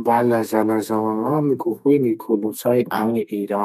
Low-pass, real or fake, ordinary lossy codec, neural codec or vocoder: 14.4 kHz; fake; none; codec, 32 kHz, 1.9 kbps, SNAC